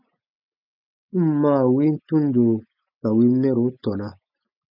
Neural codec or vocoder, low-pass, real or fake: none; 5.4 kHz; real